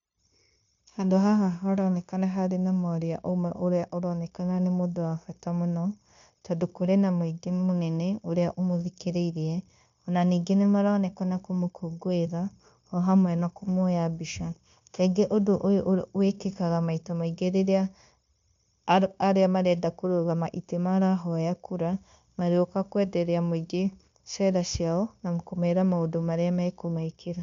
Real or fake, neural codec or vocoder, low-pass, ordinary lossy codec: fake; codec, 16 kHz, 0.9 kbps, LongCat-Audio-Codec; 7.2 kHz; MP3, 64 kbps